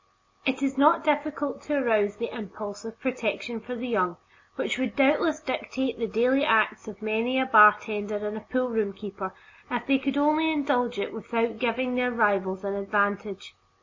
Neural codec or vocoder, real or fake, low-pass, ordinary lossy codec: none; real; 7.2 kHz; MP3, 32 kbps